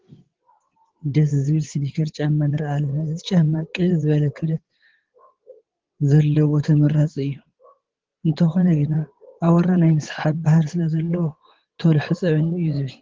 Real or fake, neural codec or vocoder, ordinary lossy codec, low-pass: fake; vocoder, 22.05 kHz, 80 mel bands, WaveNeXt; Opus, 16 kbps; 7.2 kHz